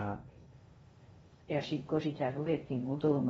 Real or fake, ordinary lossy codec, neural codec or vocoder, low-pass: fake; AAC, 24 kbps; codec, 16 kHz in and 24 kHz out, 0.8 kbps, FocalCodec, streaming, 65536 codes; 10.8 kHz